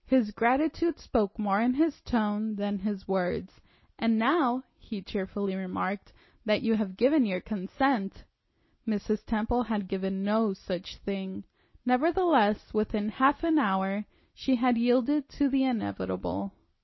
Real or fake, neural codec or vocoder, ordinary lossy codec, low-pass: real; none; MP3, 24 kbps; 7.2 kHz